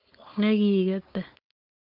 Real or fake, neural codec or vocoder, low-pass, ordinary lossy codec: fake; codec, 16 kHz, 4.8 kbps, FACodec; 5.4 kHz; Opus, 32 kbps